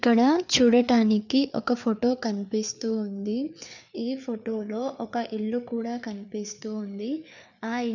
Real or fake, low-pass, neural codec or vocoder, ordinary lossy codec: fake; 7.2 kHz; codec, 16 kHz, 4 kbps, FreqCodec, larger model; none